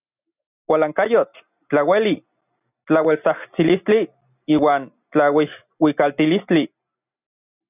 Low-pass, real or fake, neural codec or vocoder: 3.6 kHz; real; none